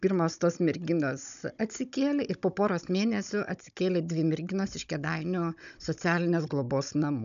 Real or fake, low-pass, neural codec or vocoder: fake; 7.2 kHz; codec, 16 kHz, 16 kbps, FunCodec, trained on Chinese and English, 50 frames a second